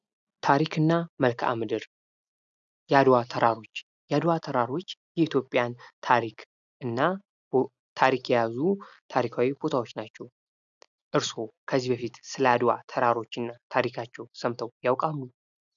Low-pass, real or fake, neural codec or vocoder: 7.2 kHz; real; none